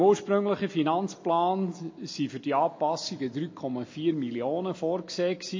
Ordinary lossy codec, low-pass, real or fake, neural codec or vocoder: MP3, 32 kbps; 7.2 kHz; real; none